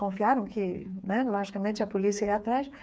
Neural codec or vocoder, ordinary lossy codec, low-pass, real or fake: codec, 16 kHz, 4 kbps, FreqCodec, smaller model; none; none; fake